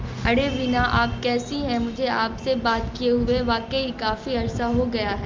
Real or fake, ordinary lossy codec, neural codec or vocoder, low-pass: real; Opus, 32 kbps; none; 7.2 kHz